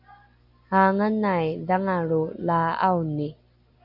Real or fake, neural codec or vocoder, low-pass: real; none; 5.4 kHz